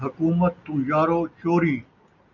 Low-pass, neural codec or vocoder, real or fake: 7.2 kHz; none; real